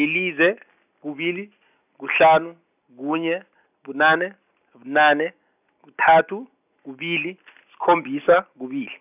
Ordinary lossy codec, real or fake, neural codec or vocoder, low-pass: none; real; none; 3.6 kHz